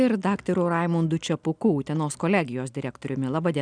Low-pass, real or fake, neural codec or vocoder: 9.9 kHz; real; none